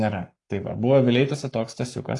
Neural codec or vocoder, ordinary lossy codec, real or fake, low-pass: codec, 44.1 kHz, 7.8 kbps, Pupu-Codec; AAC, 48 kbps; fake; 10.8 kHz